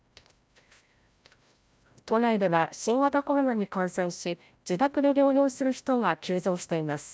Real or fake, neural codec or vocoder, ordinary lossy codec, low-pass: fake; codec, 16 kHz, 0.5 kbps, FreqCodec, larger model; none; none